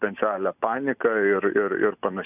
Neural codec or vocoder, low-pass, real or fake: none; 3.6 kHz; real